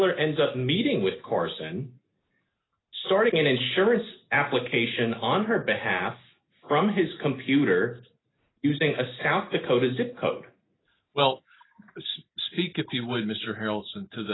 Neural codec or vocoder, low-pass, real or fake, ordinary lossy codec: none; 7.2 kHz; real; AAC, 16 kbps